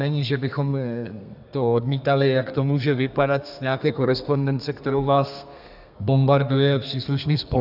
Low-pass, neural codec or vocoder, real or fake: 5.4 kHz; codec, 32 kHz, 1.9 kbps, SNAC; fake